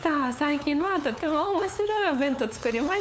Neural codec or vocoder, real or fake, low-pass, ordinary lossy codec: codec, 16 kHz, 8 kbps, FunCodec, trained on LibriTTS, 25 frames a second; fake; none; none